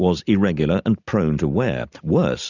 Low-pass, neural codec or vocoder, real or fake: 7.2 kHz; none; real